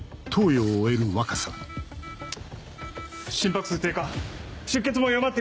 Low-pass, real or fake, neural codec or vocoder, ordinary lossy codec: none; real; none; none